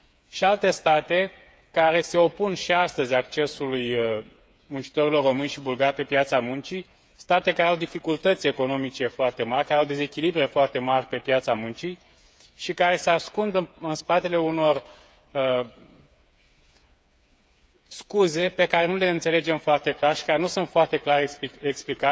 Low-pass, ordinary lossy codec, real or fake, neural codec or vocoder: none; none; fake; codec, 16 kHz, 8 kbps, FreqCodec, smaller model